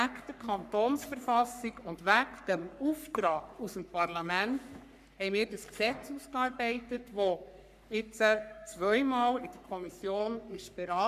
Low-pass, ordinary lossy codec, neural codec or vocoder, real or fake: 14.4 kHz; none; codec, 44.1 kHz, 3.4 kbps, Pupu-Codec; fake